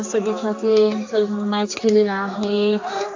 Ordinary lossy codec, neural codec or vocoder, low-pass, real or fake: AAC, 48 kbps; codec, 16 kHz, 2 kbps, X-Codec, HuBERT features, trained on general audio; 7.2 kHz; fake